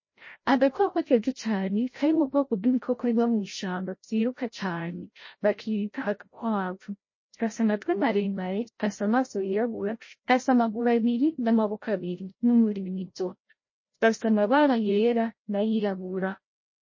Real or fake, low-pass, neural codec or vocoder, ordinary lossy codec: fake; 7.2 kHz; codec, 16 kHz, 0.5 kbps, FreqCodec, larger model; MP3, 32 kbps